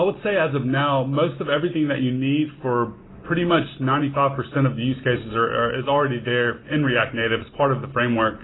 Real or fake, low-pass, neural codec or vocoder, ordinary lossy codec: real; 7.2 kHz; none; AAC, 16 kbps